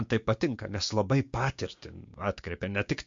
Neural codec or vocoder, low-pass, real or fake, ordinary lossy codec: codec, 16 kHz, 6 kbps, DAC; 7.2 kHz; fake; MP3, 48 kbps